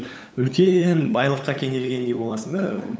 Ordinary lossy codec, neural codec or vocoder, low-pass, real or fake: none; codec, 16 kHz, 8 kbps, FunCodec, trained on LibriTTS, 25 frames a second; none; fake